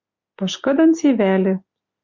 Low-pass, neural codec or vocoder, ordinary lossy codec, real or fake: 7.2 kHz; none; MP3, 64 kbps; real